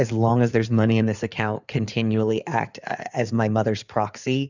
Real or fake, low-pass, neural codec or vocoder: fake; 7.2 kHz; codec, 16 kHz in and 24 kHz out, 2.2 kbps, FireRedTTS-2 codec